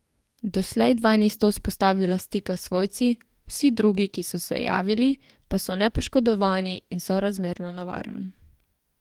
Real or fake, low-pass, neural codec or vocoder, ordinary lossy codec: fake; 19.8 kHz; codec, 44.1 kHz, 2.6 kbps, DAC; Opus, 32 kbps